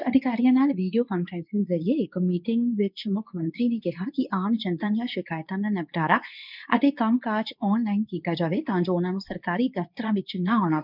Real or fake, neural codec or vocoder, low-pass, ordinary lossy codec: fake; codec, 24 kHz, 0.9 kbps, WavTokenizer, medium speech release version 2; 5.4 kHz; none